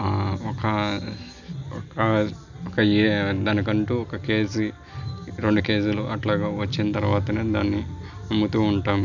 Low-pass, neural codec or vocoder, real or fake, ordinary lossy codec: 7.2 kHz; none; real; none